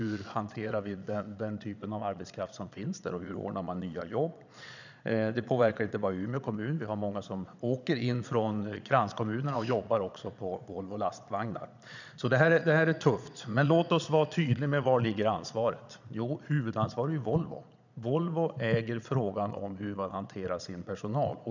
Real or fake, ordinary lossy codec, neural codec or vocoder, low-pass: fake; none; vocoder, 22.05 kHz, 80 mel bands, Vocos; 7.2 kHz